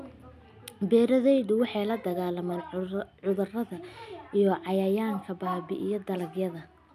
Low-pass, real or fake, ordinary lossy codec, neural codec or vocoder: 14.4 kHz; real; MP3, 96 kbps; none